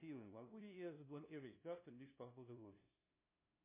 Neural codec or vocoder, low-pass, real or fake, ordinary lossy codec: codec, 16 kHz, 0.5 kbps, FunCodec, trained on LibriTTS, 25 frames a second; 3.6 kHz; fake; MP3, 24 kbps